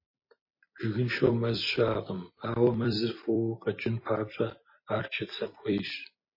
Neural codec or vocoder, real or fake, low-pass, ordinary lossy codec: vocoder, 44.1 kHz, 128 mel bands every 256 samples, BigVGAN v2; fake; 5.4 kHz; MP3, 24 kbps